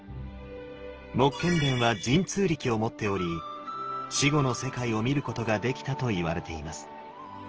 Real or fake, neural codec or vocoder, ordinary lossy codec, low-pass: real; none; Opus, 16 kbps; 7.2 kHz